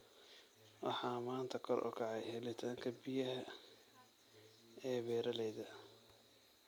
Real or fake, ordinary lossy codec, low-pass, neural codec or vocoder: real; none; none; none